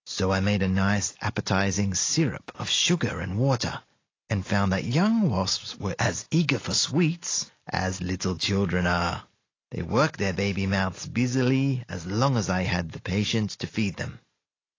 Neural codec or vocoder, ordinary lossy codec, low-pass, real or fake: none; AAC, 32 kbps; 7.2 kHz; real